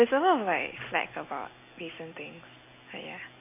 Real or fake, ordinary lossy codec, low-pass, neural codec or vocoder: real; MP3, 24 kbps; 3.6 kHz; none